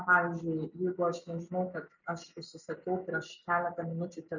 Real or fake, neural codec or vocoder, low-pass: real; none; 7.2 kHz